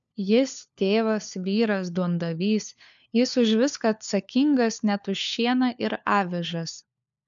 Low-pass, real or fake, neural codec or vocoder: 7.2 kHz; fake; codec, 16 kHz, 4 kbps, FunCodec, trained on LibriTTS, 50 frames a second